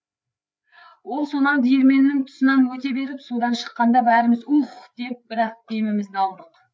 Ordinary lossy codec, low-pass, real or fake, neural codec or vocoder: none; none; fake; codec, 16 kHz, 4 kbps, FreqCodec, larger model